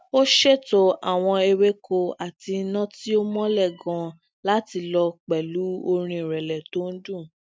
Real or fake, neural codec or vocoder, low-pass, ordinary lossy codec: real; none; none; none